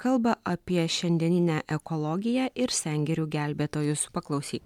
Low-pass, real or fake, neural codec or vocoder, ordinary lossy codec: 19.8 kHz; real; none; MP3, 96 kbps